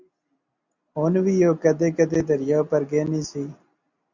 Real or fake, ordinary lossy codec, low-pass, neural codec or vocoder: real; Opus, 64 kbps; 7.2 kHz; none